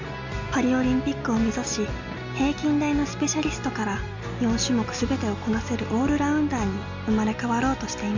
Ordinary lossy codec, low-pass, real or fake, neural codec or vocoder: MP3, 64 kbps; 7.2 kHz; real; none